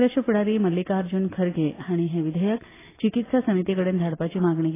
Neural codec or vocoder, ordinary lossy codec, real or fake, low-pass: none; AAC, 16 kbps; real; 3.6 kHz